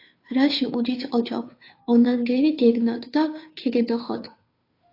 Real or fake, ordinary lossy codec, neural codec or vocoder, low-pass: fake; AAC, 32 kbps; codec, 16 kHz, 2 kbps, FunCodec, trained on Chinese and English, 25 frames a second; 5.4 kHz